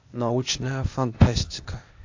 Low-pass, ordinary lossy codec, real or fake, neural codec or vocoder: 7.2 kHz; AAC, 48 kbps; fake; codec, 16 kHz, 0.8 kbps, ZipCodec